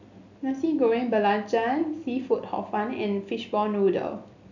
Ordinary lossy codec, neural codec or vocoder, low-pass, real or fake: none; none; 7.2 kHz; real